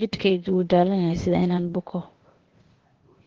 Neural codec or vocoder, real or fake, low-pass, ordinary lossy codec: codec, 16 kHz, 0.8 kbps, ZipCodec; fake; 7.2 kHz; Opus, 16 kbps